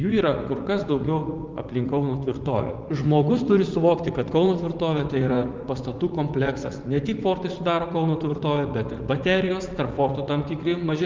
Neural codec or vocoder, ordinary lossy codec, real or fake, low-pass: vocoder, 44.1 kHz, 80 mel bands, Vocos; Opus, 32 kbps; fake; 7.2 kHz